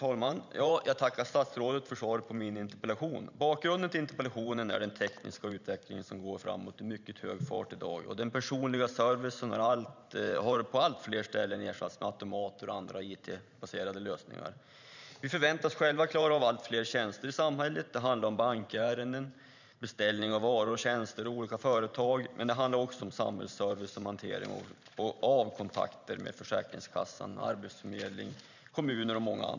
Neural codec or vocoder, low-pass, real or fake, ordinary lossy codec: vocoder, 44.1 kHz, 128 mel bands every 512 samples, BigVGAN v2; 7.2 kHz; fake; none